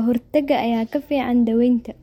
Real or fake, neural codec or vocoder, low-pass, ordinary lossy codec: real; none; 19.8 kHz; MP3, 64 kbps